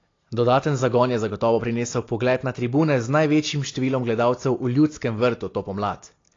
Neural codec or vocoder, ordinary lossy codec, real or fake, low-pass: none; AAC, 32 kbps; real; 7.2 kHz